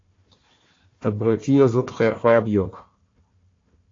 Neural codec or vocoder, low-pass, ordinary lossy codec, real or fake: codec, 16 kHz, 1 kbps, FunCodec, trained on Chinese and English, 50 frames a second; 7.2 kHz; AAC, 32 kbps; fake